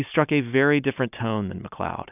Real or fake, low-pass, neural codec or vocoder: real; 3.6 kHz; none